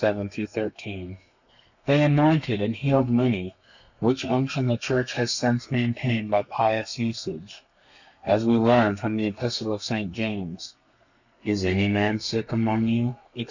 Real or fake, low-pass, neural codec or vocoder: fake; 7.2 kHz; codec, 32 kHz, 1.9 kbps, SNAC